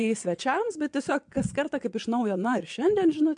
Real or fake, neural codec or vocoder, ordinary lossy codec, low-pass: fake; vocoder, 22.05 kHz, 80 mel bands, WaveNeXt; MP3, 64 kbps; 9.9 kHz